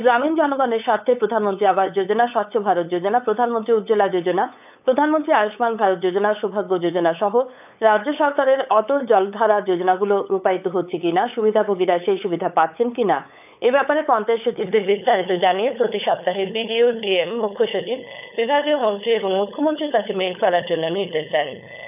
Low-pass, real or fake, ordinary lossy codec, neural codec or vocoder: 3.6 kHz; fake; none; codec, 16 kHz, 8 kbps, FunCodec, trained on LibriTTS, 25 frames a second